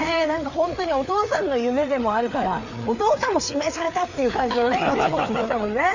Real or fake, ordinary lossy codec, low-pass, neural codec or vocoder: fake; none; 7.2 kHz; codec, 16 kHz, 4 kbps, FreqCodec, larger model